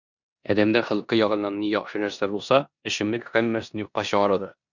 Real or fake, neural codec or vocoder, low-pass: fake; codec, 16 kHz in and 24 kHz out, 0.9 kbps, LongCat-Audio-Codec, four codebook decoder; 7.2 kHz